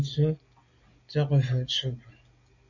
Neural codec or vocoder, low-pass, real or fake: none; 7.2 kHz; real